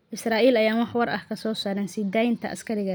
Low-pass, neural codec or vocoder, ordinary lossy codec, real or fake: none; none; none; real